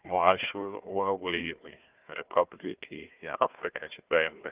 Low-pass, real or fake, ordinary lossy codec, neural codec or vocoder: 3.6 kHz; fake; Opus, 24 kbps; codec, 16 kHz, 1 kbps, FunCodec, trained on Chinese and English, 50 frames a second